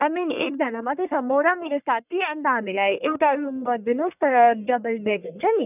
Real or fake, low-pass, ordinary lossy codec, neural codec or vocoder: fake; 3.6 kHz; none; codec, 44.1 kHz, 1.7 kbps, Pupu-Codec